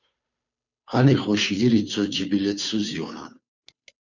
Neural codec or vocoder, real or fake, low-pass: codec, 16 kHz, 2 kbps, FunCodec, trained on Chinese and English, 25 frames a second; fake; 7.2 kHz